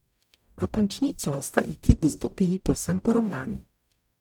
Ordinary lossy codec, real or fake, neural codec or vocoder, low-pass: none; fake; codec, 44.1 kHz, 0.9 kbps, DAC; 19.8 kHz